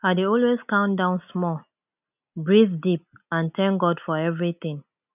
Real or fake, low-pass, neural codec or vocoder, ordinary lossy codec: real; 3.6 kHz; none; none